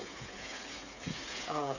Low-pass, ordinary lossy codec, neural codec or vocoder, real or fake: 7.2 kHz; none; codec, 16 kHz, 8 kbps, FreqCodec, smaller model; fake